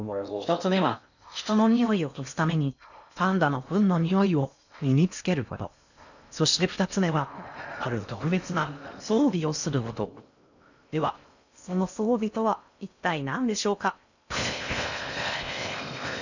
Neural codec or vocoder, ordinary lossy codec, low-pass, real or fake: codec, 16 kHz in and 24 kHz out, 0.6 kbps, FocalCodec, streaming, 4096 codes; none; 7.2 kHz; fake